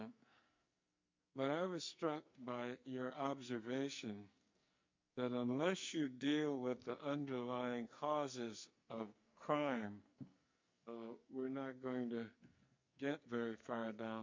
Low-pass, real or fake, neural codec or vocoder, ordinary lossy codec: 7.2 kHz; fake; codec, 44.1 kHz, 2.6 kbps, SNAC; MP3, 48 kbps